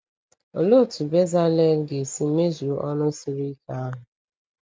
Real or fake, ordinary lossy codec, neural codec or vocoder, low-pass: real; none; none; none